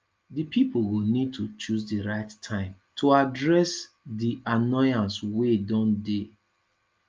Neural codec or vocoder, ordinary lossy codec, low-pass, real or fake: none; Opus, 24 kbps; 7.2 kHz; real